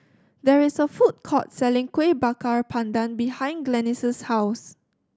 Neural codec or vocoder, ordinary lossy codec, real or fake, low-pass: none; none; real; none